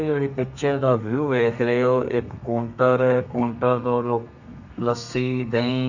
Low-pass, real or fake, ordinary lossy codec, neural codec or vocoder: 7.2 kHz; fake; none; codec, 32 kHz, 1.9 kbps, SNAC